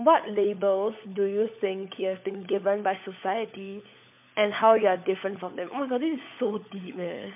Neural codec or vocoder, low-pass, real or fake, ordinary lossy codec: codec, 16 kHz, 16 kbps, FunCodec, trained on LibriTTS, 50 frames a second; 3.6 kHz; fake; MP3, 32 kbps